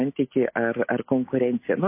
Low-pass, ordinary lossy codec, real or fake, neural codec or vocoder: 3.6 kHz; MP3, 24 kbps; real; none